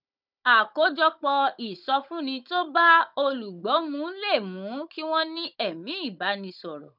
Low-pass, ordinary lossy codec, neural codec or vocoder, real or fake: 5.4 kHz; none; codec, 16 kHz, 16 kbps, FunCodec, trained on Chinese and English, 50 frames a second; fake